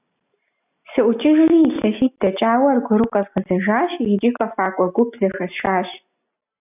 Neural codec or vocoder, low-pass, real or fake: none; 3.6 kHz; real